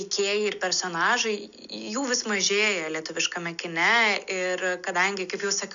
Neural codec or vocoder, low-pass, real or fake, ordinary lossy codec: none; 7.2 kHz; real; MP3, 96 kbps